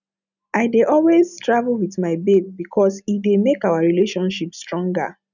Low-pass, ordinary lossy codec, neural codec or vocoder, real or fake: 7.2 kHz; none; none; real